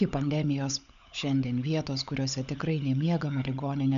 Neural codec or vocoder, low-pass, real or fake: codec, 16 kHz, 16 kbps, FunCodec, trained on LibriTTS, 50 frames a second; 7.2 kHz; fake